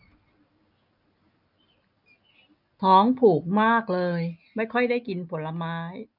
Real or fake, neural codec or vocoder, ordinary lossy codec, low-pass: real; none; none; 5.4 kHz